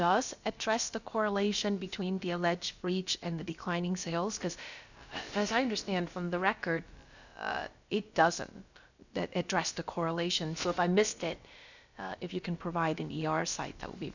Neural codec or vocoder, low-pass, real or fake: codec, 16 kHz, about 1 kbps, DyCAST, with the encoder's durations; 7.2 kHz; fake